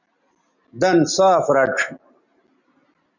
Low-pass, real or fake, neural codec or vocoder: 7.2 kHz; real; none